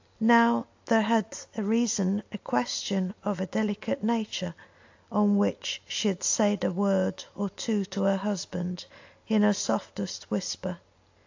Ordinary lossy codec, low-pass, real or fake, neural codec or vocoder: AAC, 48 kbps; 7.2 kHz; real; none